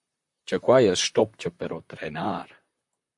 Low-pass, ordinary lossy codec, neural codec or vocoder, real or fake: 10.8 kHz; MP3, 64 kbps; vocoder, 44.1 kHz, 128 mel bands, Pupu-Vocoder; fake